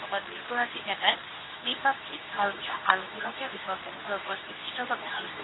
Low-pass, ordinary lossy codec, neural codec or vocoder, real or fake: 7.2 kHz; AAC, 16 kbps; codec, 24 kHz, 0.9 kbps, WavTokenizer, medium speech release version 2; fake